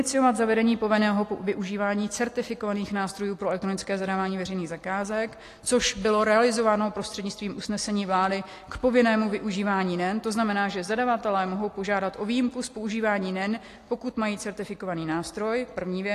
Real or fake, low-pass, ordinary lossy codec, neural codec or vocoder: real; 14.4 kHz; AAC, 48 kbps; none